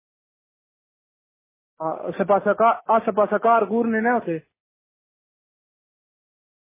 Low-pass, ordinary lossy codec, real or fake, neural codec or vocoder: 3.6 kHz; MP3, 16 kbps; real; none